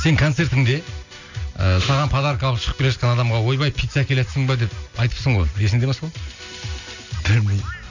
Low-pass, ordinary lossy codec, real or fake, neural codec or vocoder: 7.2 kHz; none; real; none